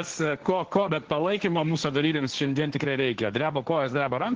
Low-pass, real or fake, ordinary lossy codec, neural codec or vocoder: 7.2 kHz; fake; Opus, 16 kbps; codec, 16 kHz, 1.1 kbps, Voila-Tokenizer